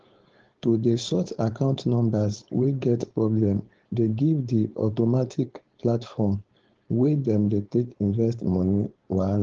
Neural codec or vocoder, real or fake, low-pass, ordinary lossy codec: codec, 16 kHz, 4.8 kbps, FACodec; fake; 7.2 kHz; Opus, 16 kbps